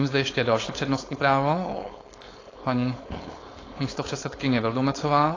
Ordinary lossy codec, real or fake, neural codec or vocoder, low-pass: AAC, 32 kbps; fake; codec, 16 kHz, 4.8 kbps, FACodec; 7.2 kHz